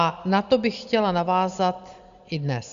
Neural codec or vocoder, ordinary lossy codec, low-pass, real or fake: none; Opus, 64 kbps; 7.2 kHz; real